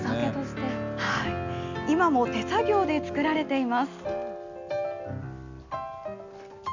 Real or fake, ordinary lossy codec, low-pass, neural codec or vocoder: real; none; 7.2 kHz; none